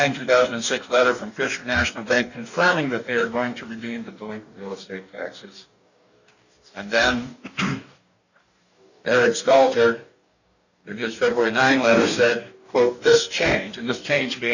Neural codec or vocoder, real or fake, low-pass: codec, 44.1 kHz, 2.6 kbps, DAC; fake; 7.2 kHz